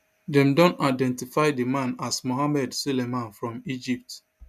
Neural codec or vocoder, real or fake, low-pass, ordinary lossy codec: none; real; 14.4 kHz; none